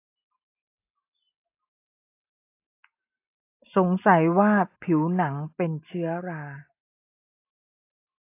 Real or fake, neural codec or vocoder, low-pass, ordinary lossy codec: real; none; 3.6 kHz; AAC, 24 kbps